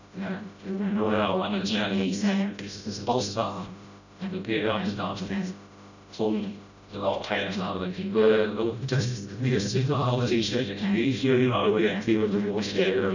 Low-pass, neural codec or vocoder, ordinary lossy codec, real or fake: 7.2 kHz; codec, 16 kHz, 0.5 kbps, FreqCodec, smaller model; none; fake